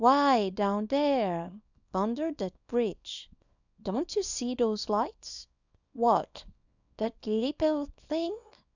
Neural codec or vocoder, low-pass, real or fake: codec, 24 kHz, 0.9 kbps, WavTokenizer, small release; 7.2 kHz; fake